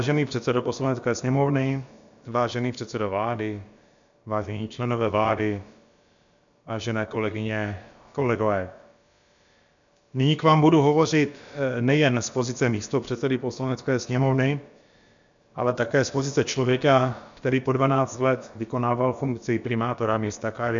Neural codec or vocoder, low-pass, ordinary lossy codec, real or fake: codec, 16 kHz, about 1 kbps, DyCAST, with the encoder's durations; 7.2 kHz; MP3, 64 kbps; fake